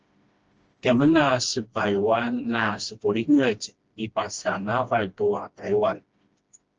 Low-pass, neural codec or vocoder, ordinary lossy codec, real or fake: 7.2 kHz; codec, 16 kHz, 1 kbps, FreqCodec, smaller model; Opus, 24 kbps; fake